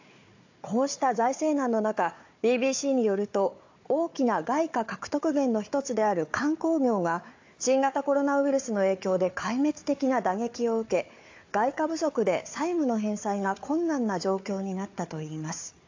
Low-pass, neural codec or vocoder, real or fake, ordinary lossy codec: 7.2 kHz; codec, 16 kHz, 4 kbps, FunCodec, trained on Chinese and English, 50 frames a second; fake; AAC, 48 kbps